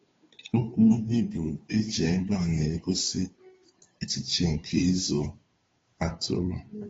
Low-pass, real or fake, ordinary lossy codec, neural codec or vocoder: 7.2 kHz; fake; AAC, 24 kbps; codec, 16 kHz, 2 kbps, FunCodec, trained on Chinese and English, 25 frames a second